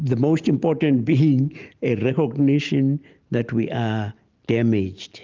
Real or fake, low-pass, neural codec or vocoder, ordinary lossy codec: real; 7.2 kHz; none; Opus, 32 kbps